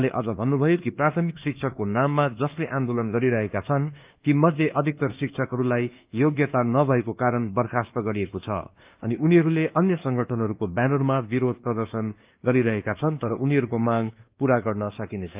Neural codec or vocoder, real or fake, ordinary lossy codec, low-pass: codec, 24 kHz, 1.2 kbps, DualCodec; fake; Opus, 24 kbps; 3.6 kHz